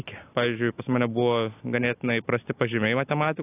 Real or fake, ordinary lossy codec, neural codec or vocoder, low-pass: real; AAC, 24 kbps; none; 3.6 kHz